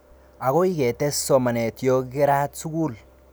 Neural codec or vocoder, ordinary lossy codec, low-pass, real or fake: none; none; none; real